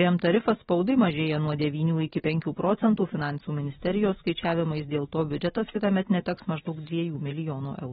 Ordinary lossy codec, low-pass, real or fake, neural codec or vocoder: AAC, 16 kbps; 7.2 kHz; real; none